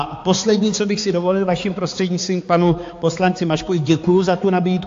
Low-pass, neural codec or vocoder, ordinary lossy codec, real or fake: 7.2 kHz; codec, 16 kHz, 4 kbps, X-Codec, HuBERT features, trained on balanced general audio; AAC, 48 kbps; fake